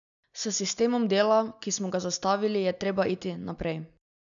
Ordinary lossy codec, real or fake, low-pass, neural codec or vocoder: none; real; 7.2 kHz; none